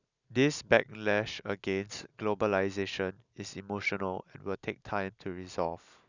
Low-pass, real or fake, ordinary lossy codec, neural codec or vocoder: 7.2 kHz; real; none; none